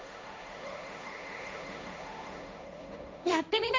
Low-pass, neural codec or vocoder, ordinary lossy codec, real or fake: none; codec, 16 kHz, 1.1 kbps, Voila-Tokenizer; none; fake